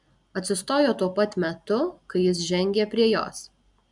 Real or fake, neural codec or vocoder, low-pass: real; none; 10.8 kHz